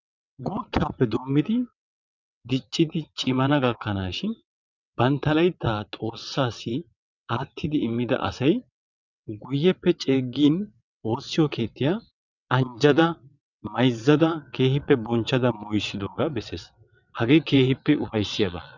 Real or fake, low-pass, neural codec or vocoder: fake; 7.2 kHz; vocoder, 22.05 kHz, 80 mel bands, WaveNeXt